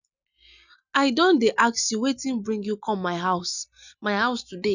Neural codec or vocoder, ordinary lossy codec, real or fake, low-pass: none; none; real; 7.2 kHz